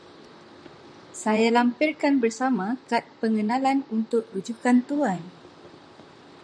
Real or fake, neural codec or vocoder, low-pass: fake; vocoder, 44.1 kHz, 128 mel bands, Pupu-Vocoder; 9.9 kHz